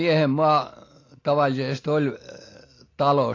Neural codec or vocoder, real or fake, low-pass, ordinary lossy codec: none; real; 7.2 kHz; AAC, 32 kbps